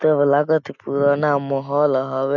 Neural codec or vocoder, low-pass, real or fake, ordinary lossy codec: none; 7.2 kHz; real; none